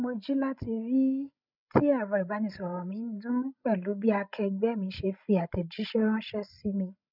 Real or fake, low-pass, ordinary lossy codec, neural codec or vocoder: fake; 5.4 kHz; none; codec, 16 kHz, 16 kbps, FreqCodec, larger model